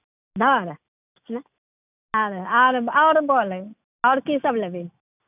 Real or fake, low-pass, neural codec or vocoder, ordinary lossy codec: real; 3.6 kHz; none; none